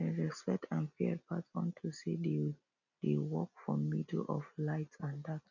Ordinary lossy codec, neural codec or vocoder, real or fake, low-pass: none; none; real; 7.2 kHz